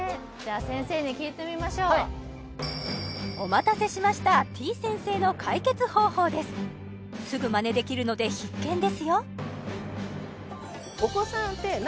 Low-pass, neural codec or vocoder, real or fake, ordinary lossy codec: none; none; real; none